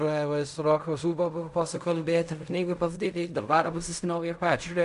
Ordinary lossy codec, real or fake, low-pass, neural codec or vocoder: MP3, 96 kbps; fake; 10.8 kHz; codec, 16 kHz in and 24 kHz out, 0.4 kbps, LongCat-Audio-Codec, fine tuned four codebook decoder